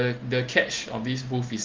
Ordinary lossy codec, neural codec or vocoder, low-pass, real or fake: Opus, 16 kbps; none; 7.2 kHz; real